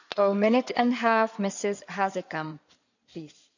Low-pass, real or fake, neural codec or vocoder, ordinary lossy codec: 7.2 kHz; fake; codec, 16 kHz in and 24 kHz out, 2.2 kbps, FireRedTTS-2 codec; none